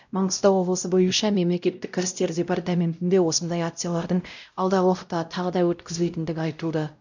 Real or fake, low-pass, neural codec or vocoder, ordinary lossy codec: fake; 7.2 kHz; codec, 16 kHz, 0.5 kbps, X-Codec, WavLM features, trained on Multilingual LibriSpeech; none